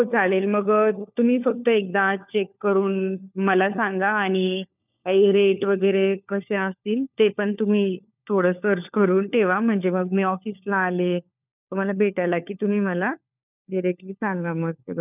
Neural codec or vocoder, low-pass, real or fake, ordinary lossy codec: codec, 16 kHz, 4 kbps, FunCodec, trained on LibriTTS, 50 frames a second; 3.6 kHz; fake; none